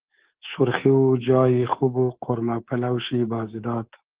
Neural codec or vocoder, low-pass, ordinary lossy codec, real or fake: codec, 24 kHz, 3.1 kbps, DualCodec; 3.6 kHz; Opus, 16 kbps; fake